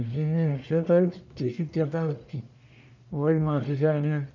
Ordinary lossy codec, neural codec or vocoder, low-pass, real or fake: MP3, 64 kbps; codec, 44.1 kHz, 1.7 kbps, Pupu-Codec; 7.2 kHz; fake